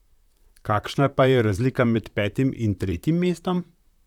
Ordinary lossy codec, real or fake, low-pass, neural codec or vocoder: none; fake; 19.8 kHz; vocoder, 44.1 kHz, 128 mel bands, Pupu-Vocoder